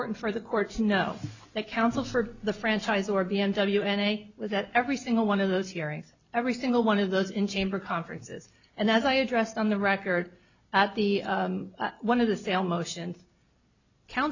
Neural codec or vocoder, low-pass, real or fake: none; 7.2 kHz; real